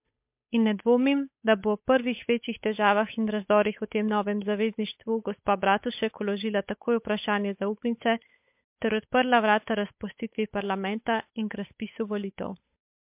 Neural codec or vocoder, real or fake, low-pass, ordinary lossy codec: codec, 16 kHz, 8 kbps, FunCodec, trained on Chinese and English, 25 frames a second; fake; 3.6 kHz; MP3, 32 kbps